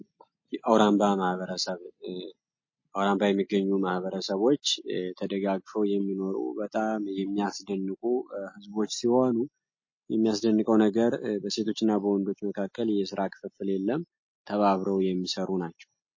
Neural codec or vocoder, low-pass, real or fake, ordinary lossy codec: none; 7.2 kHz; real; MP3, 32 kbps